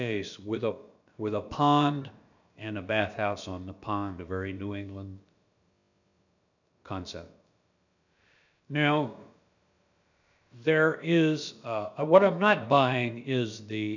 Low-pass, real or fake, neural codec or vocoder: 7.2 kHz; fake; codec, 16 kHz, about 1 kbps, DyCAST, with the encoder's durations